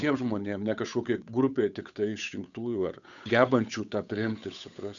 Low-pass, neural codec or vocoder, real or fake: 7.2 kHz; codec, 16 kHz, 8 kbps, FunCodec, trained on Chinese and English, 25 frames a second; fake